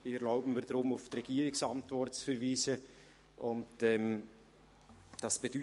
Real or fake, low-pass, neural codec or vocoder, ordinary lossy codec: fake; 14.4 kHz; codec, 44.1 kHz, 7.8 kbps, DAC; MP3, 48 kbps